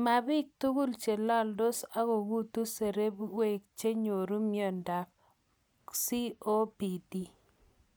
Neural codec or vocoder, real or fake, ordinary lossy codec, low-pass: none; real; none; none